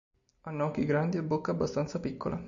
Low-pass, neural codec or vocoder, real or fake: 7.2 kHz; none; real